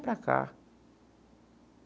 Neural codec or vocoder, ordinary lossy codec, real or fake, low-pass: none; none; real; none